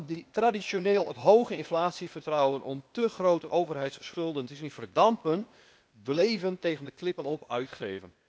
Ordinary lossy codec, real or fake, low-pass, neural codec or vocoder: none; fake; none; codec, 16 kHz, 0.8 kbps, ZipCodec